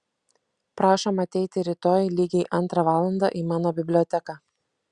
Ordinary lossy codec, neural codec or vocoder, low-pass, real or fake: Opus, 64 kbps; none; 9.9 kHz; real